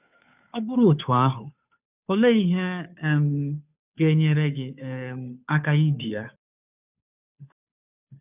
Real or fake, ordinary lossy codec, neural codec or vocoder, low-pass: fake; none; codec, 16 kHz, 2 kbps, FunCodec, trained on Chinese and English, 25 frames a second; 3.6 kHz